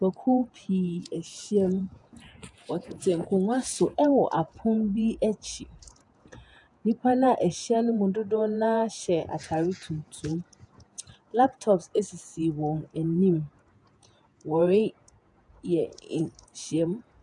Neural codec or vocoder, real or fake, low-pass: vocoder, 48 kHz, 128 mel bands, Vocos; fake; 10.8 kHz